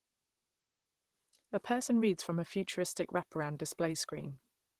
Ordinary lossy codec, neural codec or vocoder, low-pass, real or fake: Opus, 16 kbps; vocoder, 44.1 kHz, 128 mel bands, Pupu-Vocoder; 14.4 kHz; fake